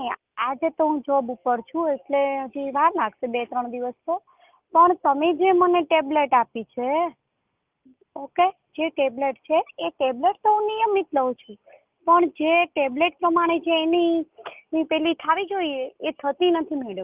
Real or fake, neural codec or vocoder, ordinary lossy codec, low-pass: real; none; Opus, 24 kbps; 3.6 kHz